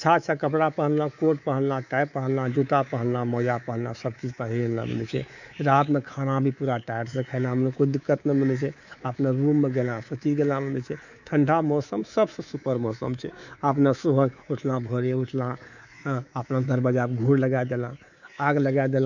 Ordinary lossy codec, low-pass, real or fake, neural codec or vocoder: none; 7.2 kHz; fake; codec, 24 kHz, 3.1 kbps, DualCodec